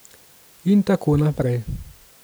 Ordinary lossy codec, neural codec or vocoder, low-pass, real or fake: none; none; none; real